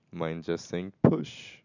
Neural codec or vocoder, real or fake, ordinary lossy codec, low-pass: none; real; none; 7.2 kHz